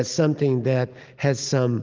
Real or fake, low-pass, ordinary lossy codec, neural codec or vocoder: real; 7.2 kHz; Opus, 32 kbps; none